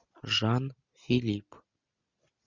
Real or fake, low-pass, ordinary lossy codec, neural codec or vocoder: real; 7.2 kHz; Opus, 64 kbps; none